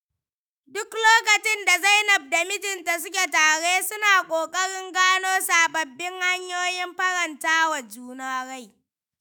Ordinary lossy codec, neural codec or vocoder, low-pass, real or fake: none; autoencoder, 48 kHz, 128 numbers a frame, DAC-VAE, trained on Japanese speech; none; fake